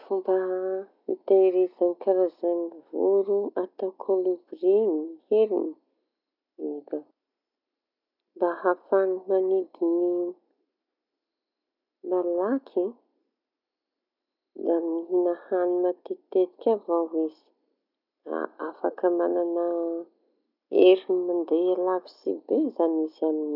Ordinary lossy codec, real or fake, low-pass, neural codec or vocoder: none; real; 5.4 kHz; none